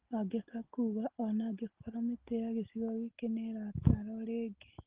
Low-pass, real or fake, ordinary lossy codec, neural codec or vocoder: 3.6 kHz; real; Opus, 16 kbps; none